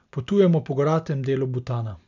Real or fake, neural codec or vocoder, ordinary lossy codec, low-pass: real; none; none; 7.2 kHz